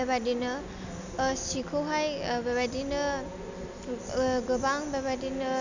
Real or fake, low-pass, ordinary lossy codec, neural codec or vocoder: real; 7.2 kHz; none; none